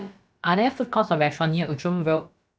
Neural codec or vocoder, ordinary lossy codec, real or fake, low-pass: codec, 16 kHz, about 1 kbps, DyCAST, with the encoder's durations; none; fake; none